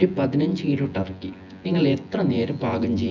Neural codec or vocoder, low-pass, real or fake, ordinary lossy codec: vocoder, 24 kHz, 100 mel bands, Vocos; 7.2 kHz; fake; none